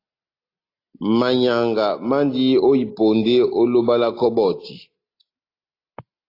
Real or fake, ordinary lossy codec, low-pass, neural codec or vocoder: real; AAC, 32 kbps; 5.4 kHz; none